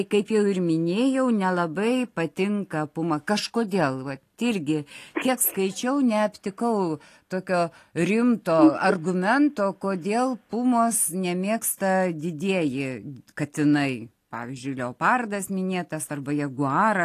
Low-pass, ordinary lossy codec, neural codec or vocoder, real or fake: 14.4 kHz; AAC, 48 kbps; none; real